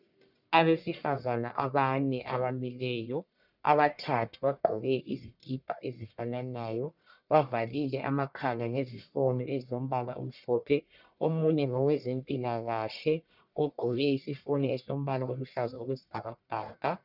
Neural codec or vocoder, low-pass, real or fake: codec, 44.1 kHz, 1.7 kbps, Pupu-Codec; 5.4 kHz; fake